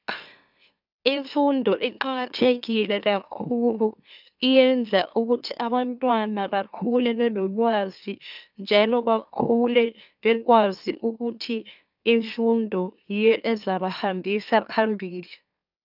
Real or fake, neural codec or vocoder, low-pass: fake; autoencoder, 44.1 kHz, a latent of 192 numbers a frame, MeloTTS; 5.4 kHz